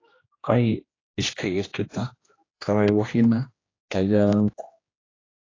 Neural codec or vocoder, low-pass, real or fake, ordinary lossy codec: codec, 16 kHz, 1 kbps, X-Codec, HuBERT features, trained on general audio; 7.2 kHz; fake; AAC, 32 kbps